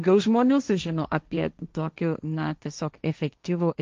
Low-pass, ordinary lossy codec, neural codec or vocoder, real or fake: 7.2 kHz; Opus, 32 kbps; codec, 16 kHz, 1.1 kbps, Voila-Tokenizer; fake